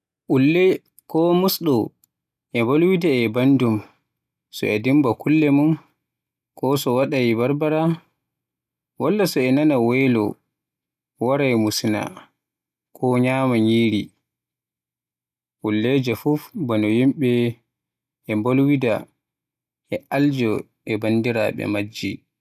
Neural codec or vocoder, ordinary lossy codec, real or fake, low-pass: none; none; real; 14.4 kHz